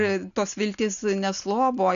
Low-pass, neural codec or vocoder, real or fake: 7.2 kHz; none; real